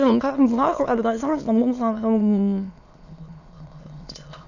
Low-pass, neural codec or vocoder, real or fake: 7.2 kHz; autoencoder, 22.05 kHz, a latent of 192 numbers a frame, VITS, trained on many speakers; fake